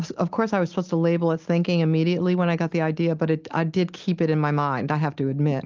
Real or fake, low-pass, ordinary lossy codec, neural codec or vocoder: real; 7.2 kHz; Opus, 32 kbps; none